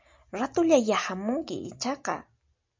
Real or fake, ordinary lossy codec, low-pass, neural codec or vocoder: real; MP3, 64 kbps; 7.2 kHz; none